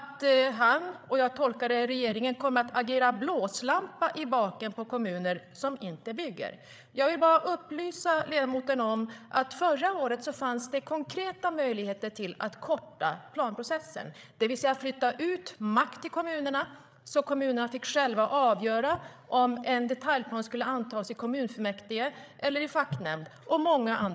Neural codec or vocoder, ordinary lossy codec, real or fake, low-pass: codec, 16 kHz, 8 kbps, FreqCodec, larger model; none; fake; none